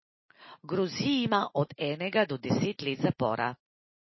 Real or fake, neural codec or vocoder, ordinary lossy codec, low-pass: real; none; MP3, 24 kbps; 7.2 kHz